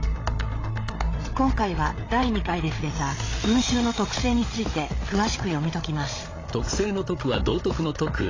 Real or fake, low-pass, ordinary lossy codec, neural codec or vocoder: fake; 7.2 kHz; AAC, 32 kbps; codec, 16 kHz, 8 kbps, FreqCodec, larger model